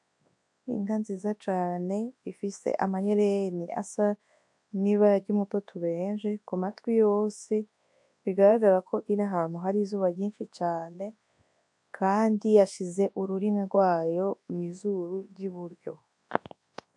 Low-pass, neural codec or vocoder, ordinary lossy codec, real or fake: 10.8 kHz; codec, 24 kHz, 0.9 kbps, WavTokenizer, large speech release; AAC, 64 kbps; fake